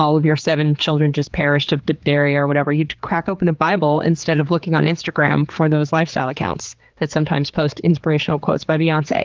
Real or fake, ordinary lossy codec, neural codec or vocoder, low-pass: fake; Opus, 32 kbps; codec, 16 kHz, 4 kbps, X-Codec, HuBERT features, trained on general audio; 7.2 kHz